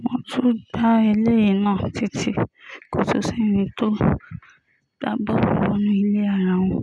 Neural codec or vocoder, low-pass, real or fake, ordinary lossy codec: none; none; real; none